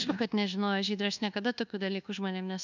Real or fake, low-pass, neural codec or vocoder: fake; 7.2 kHz; codec, 24 kHz, 1.2 kbps, DualCodec